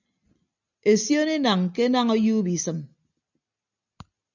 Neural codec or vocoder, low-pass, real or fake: none; 7.2 kHz; real